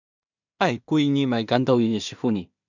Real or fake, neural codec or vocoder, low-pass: fake; codec, 16 kHz in and 24 kHz out, 0.4 kbps, LongCat-Audio-Codec, two codebook decoder; 7.2 kHz